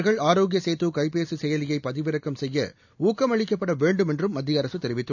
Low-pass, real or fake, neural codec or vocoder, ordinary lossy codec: 7.2 kHz; real; none; none